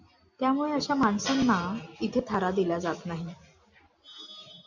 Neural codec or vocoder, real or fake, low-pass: none; real; 7.2 kHz